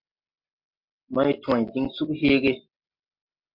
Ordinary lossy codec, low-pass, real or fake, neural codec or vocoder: MP3, 48 kbps; 5.4 kHz; real; none